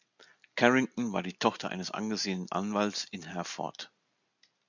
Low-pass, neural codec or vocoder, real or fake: 7.2 kHz; none; real